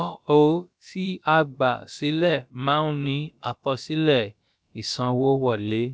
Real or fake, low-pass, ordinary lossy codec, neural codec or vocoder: fake; none; none; codec, 16 kHz, about 1 kbps, DyCAST, with the encoder's durations